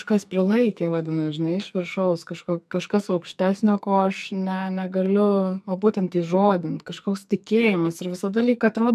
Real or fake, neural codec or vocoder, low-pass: fake; codec, 44.1 kHz, 2.6 kbps, SNAC; 14.4 kHz